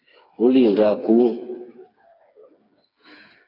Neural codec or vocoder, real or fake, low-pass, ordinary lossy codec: codec, 16 kHz, 4 kbps, FreqCodec, smaller model; fake; 5.4 kHz; AAC, 24 kbps